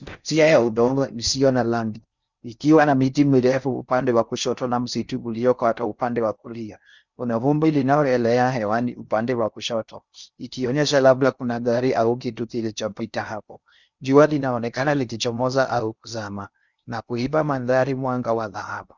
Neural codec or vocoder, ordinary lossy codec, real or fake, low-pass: codec, 16 kHz in and 24 kHz out, 0.6 kbps, FocalCodec, streaming, 4096 codes; Opus, 64 kbps; fake; 7.2 kHz